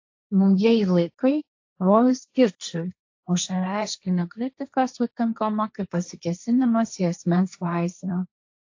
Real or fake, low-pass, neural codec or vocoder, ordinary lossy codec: fake; 7.2 kHz; codec, 16 kHz, 1.1 kbps, Voila-Tokenizer; AAC, 48 kbps